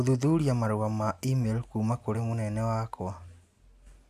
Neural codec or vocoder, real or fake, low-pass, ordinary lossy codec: none; real; 14.4 kHz; none